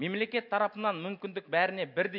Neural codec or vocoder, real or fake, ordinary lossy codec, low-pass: none; real; MP3, 48 kbps; 5.4 kHz